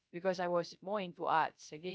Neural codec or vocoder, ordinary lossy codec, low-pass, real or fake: codec, 16 kHz, 0.3 kbps, FocalCodec; none; none; fake